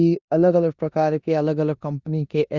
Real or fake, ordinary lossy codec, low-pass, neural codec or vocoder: fake; Opus, 64 kbps; 7.2 kHz; codec, 16 kHz in and 24 kHz out, 0.9 kbps, LongCat-Audio-Codec, four codebook decoder